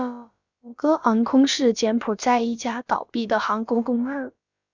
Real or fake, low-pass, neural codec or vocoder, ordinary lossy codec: fake; 7.2 kHz; codec, 16 kHz, about 1 kbps, DyCAST, with the encoder's durations; Opus, 64 kbps